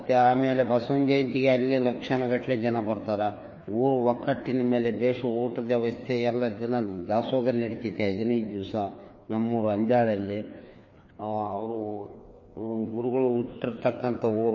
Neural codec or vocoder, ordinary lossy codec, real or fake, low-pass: codec, 16 kHz, 2 kbps, FreqCodec, larger model; MP3, 32 kbps; fake; 7.2 kHz